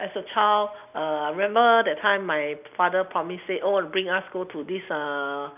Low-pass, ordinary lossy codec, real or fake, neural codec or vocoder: 3.6 kHz; none; real; none